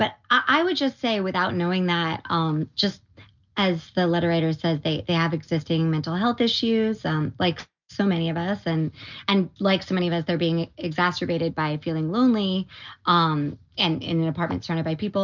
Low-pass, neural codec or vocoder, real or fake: 7.2 kHz; none; real